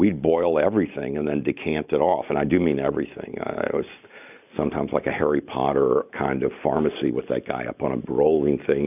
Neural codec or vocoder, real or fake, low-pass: none; real; 3.6 kHz